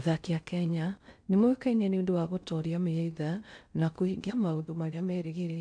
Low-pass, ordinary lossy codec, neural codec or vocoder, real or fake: 9.9 kHz; MP3, 64 kbps; codec, 16 kHz in and 24 kHz out, 0.6 kbps, FocalCodec, streaming, 2048 codes; fake